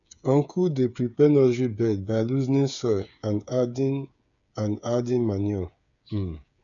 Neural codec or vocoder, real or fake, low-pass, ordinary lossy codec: codec, 16 kHz, 8 kbps, FreqCodec, smaller model; fake; 7.2 kHz; none